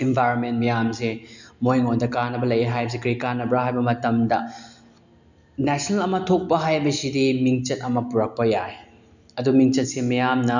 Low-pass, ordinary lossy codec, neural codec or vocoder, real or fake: 7.2 kHz; none; none; real